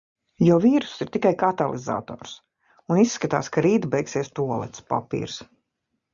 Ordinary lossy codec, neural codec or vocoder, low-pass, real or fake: Opus, 64 kbps; none; 7.2 kHz; real